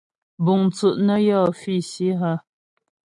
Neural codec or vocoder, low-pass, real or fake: none; 10.8 kHz; real